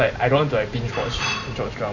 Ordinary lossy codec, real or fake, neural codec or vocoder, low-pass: none; fake; vocoder, 44.1 kHz, 128 mel bands every 512 samples, BigVGAN v2; 7.2 kHz